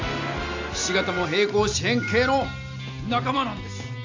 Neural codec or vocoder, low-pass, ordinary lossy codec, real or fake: none; 7.2 kHz; AAC, 48 kbps; real